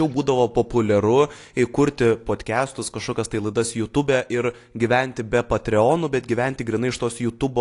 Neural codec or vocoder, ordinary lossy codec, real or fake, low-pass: none; AAC, 48 kbps; real; 10.8 kHz